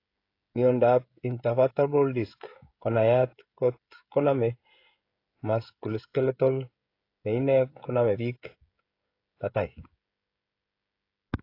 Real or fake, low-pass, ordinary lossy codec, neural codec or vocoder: fake; 5.4 kHz; AAC, 32 kbps; codec, 16 kHz, 16 kbps, FreqCodec, smaller model